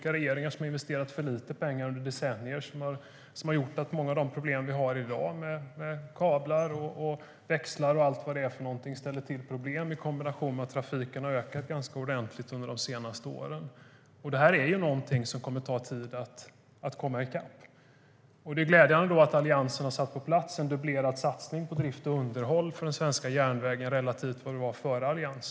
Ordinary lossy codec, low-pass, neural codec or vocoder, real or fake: none; none; none; real